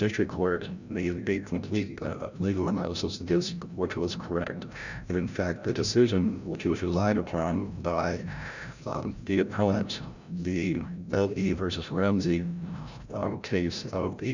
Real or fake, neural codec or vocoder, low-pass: fake; codec, 16 kHz, 0.5 kbps, FreqCodec, larger model; 7.2 kHz